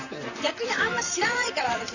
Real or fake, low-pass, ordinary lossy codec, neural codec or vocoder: fake; 7.2 kHz; none; vocoder, 44.1 kHz, 128 mel bands, Pupu-Vocoder